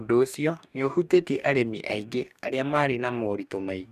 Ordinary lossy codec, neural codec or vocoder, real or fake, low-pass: none; codec, 44.1 kHz, 2.6 kbps, DAC; fake; 14.4 kHz